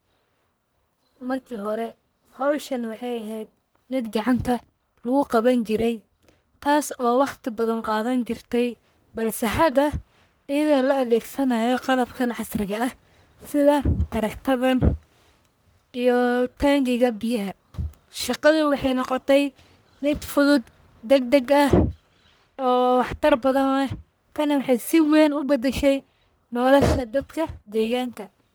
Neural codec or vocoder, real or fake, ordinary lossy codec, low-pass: codec, 44.1 kHz, 1.7 kbps, Pupu-Codec; fake; none; none